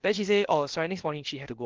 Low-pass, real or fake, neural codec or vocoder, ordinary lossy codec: 7.2 kHz; fake; codec, 16 kHz, about 1 kbps, DyCAST, with the encoder's durations; Opus, 16 kbps